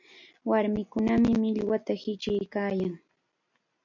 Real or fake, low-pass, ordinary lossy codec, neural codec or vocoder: real; 7.2 kHz; MP3, 48 kbps; none